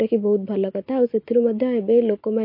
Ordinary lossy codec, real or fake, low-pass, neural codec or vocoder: MP3, 32 kbps; real; 5.4 kHz; none